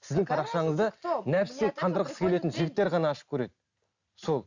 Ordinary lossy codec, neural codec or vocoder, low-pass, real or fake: none; none; 7.2 kHz; real